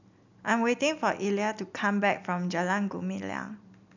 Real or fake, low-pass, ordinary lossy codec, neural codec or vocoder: real; 7.2 kHz; none; none